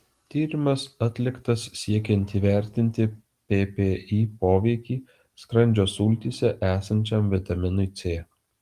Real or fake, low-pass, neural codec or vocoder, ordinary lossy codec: real; 14.4 kHz; none; Opus, 24 kbps